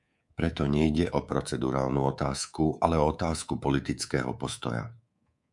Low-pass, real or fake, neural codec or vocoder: 10.8 kHz; fake; codec, 24 kHz, 3.1 kbps, DualCodec